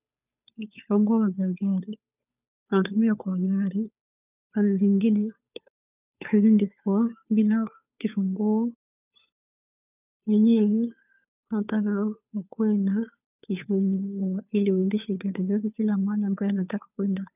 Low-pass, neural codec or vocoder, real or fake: 3.6 kHz; codec, 16 kHz, 2 kbps, FunCodec, trained on Chinese and English, 25 frames a second; fake